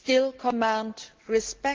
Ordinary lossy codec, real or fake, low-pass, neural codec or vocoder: Opus, 16 kbps; real; 7.2 kHz; none